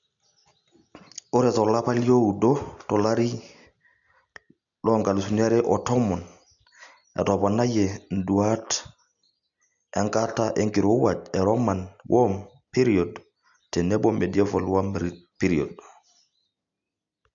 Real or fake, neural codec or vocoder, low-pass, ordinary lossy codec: real; none; 7.2 kHz; none